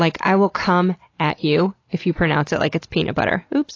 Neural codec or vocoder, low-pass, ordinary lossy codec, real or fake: none; 7.2 kHz; AAC, 32 kbps; real